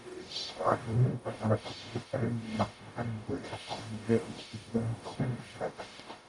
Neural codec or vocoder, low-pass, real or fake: codec, 44.1 kHz, 0.9 kbps, DAC; 10.8 kHz; fake